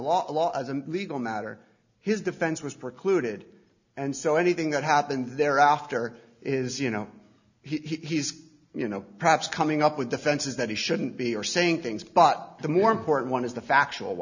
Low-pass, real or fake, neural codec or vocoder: 7.2 kHz; real; none